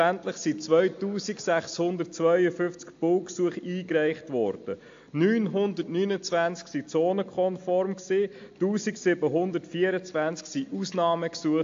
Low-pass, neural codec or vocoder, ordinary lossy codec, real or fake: 7.2 kHz; none; AAC, 48 kbps; real